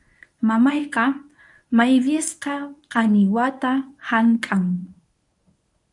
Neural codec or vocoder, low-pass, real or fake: codec, 24 kHz, 0.9 kbps, WavTokenizer, medium speech release version 1; 10.8 kHz; fake